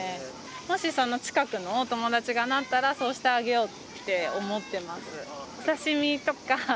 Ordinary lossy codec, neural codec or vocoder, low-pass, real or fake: none; none; none; real